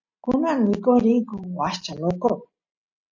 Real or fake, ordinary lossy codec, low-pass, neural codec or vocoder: real; MP3, 48 kbps; 7.2 kHz; none